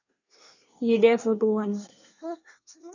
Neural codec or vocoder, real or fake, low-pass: codec, 24 kHz, 1 kbps, SNAC; fake; 7.2 kHz